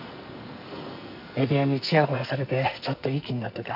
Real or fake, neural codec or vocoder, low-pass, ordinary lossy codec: fake; codec, 44.1 kHz, 2.6 kbps, SNAC; 5.4 kHz; none